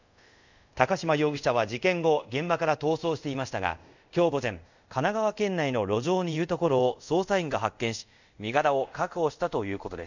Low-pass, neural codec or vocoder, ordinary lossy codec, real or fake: 7.2 kHz; codec, 24 kHz, 0.5 kbps, DualCodec; none; fake